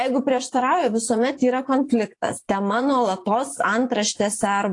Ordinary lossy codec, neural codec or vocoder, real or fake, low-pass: AAC, 48 kbps; vocoder, 24 kHz, 100 mel bands, Vocos; fake; 10.8 kHz